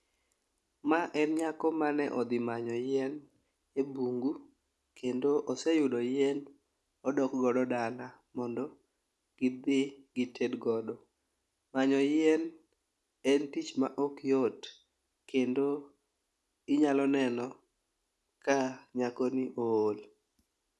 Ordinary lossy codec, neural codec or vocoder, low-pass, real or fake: none; none; none; real